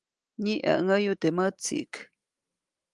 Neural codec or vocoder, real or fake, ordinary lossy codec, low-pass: autoencoder, 48 kHz, 128 numbers a frame, DAC-VAE, trained on Japanese speech; fake; Opus, 24 kbps; 10.8 kHz